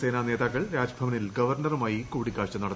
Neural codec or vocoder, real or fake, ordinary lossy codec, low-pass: none; real; none; none